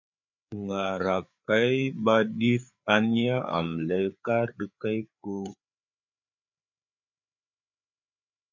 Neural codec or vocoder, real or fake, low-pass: codec, 16 kHz, 4 kbps, FreqCodec, larger model; fake; 7.2 kHz